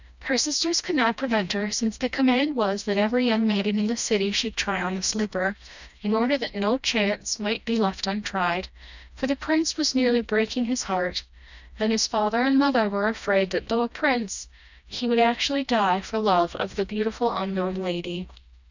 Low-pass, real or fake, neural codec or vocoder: 7.2 kHz; fake; codec, 16 kHz, 1 kbps, FreqCodec, smaller model